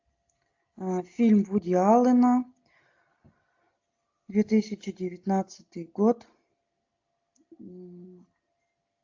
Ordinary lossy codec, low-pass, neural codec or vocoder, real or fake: AAC, 48 kbps; 7.2 kHz; none; real